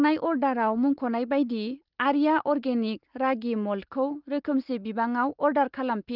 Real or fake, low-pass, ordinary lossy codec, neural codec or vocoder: real; 5.4 kHz; Opus, 32 kbps; none